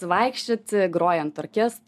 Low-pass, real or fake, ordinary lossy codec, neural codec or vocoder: 14.4 kHz; real; MP3, 96 kbps; none